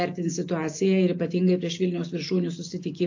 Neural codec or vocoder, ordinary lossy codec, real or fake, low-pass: none; AAC, 48 kbps; real; 7.2 kHz